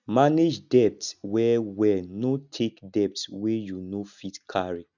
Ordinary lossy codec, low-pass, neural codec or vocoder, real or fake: none; 7.2 kHz; none; real